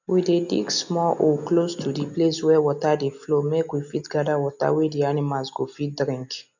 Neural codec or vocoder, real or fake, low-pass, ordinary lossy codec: none; real; 7.2 kHz; none